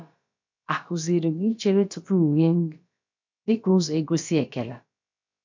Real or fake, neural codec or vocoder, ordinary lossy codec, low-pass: fake; codec, 16 kHz, about 1 kbps, DyCAST, with the encoder's durations; MP3, 64 kbps; 7.2 kHz